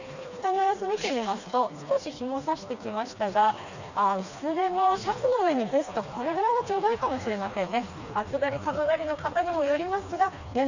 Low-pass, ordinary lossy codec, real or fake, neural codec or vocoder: 7.2 kHz; none; fake; codec, 16 kHz, 2 kbps, FreqCodec, smaller model